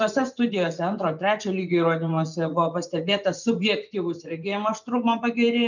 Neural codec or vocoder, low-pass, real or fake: vocoder, 24 kHz, 100 mel bands, Vocos; 7.2 kHz; fake